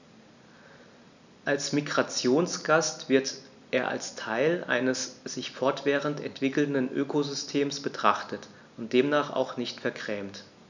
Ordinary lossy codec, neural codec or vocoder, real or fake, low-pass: none; none; real; 7.2 kHz